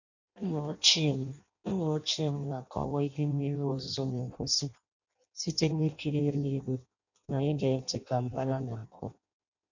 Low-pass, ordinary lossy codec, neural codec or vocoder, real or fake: 7.2 kHz; none; codec, 16 kHz in and 24 kHz out, 0.6 kbps, FireRedTTS-2 codec; fake